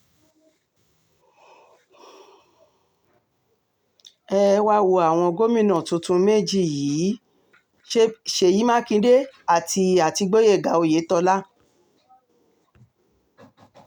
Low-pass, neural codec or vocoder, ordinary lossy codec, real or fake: 19.8 kHz; none; none; real